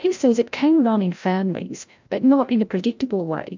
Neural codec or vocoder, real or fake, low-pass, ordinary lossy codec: codec, 16 kHz, 0.5 kbps, FreqCodec, larger model; fake; 7.2 kHz; MP3, 64 kbps